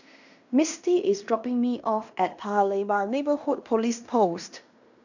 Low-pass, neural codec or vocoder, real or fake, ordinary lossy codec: 7.2 kHz; codec, 16 kHz in and 24 kHz out, 0.9 kbps, LongCat-Audio-Codec, fine tuned four codebook decoder; fake; none